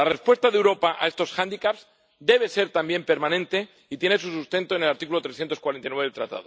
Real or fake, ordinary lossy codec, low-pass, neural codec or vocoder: real; none; none; none